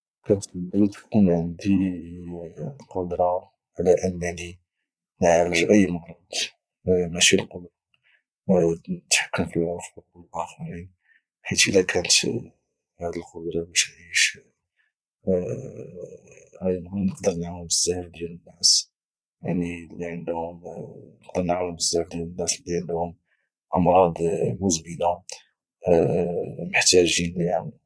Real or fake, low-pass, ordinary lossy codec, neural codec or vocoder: fake; none; none; vocoder, 22.05 kHz, 80 mel bands, Vocos